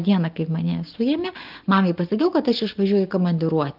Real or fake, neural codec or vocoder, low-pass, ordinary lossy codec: real; none; 5.4 kHz; Opus, 24 kbps